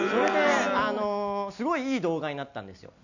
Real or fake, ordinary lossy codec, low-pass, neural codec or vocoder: real; none; 7.2 kHz; none